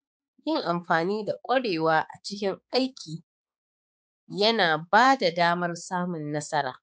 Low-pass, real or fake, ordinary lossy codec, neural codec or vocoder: none; fake; none; codec, 16 kHz, 4 kbps, X-Codec, HuBERT features, trained on balanced general audio